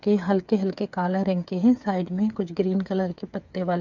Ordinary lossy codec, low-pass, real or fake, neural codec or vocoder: none; 7.2 kHz; fake; codec, 16 kHz, 8 kbps, FreqCodec, smaller model